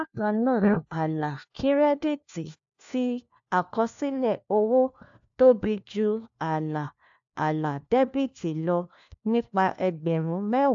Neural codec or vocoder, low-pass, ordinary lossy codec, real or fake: codec, 16 kHz, 1 kbps, FunCodec, trained on LibriTTS, 50 frames a second; 7.2 kHz; AAC, 64 kbps; fake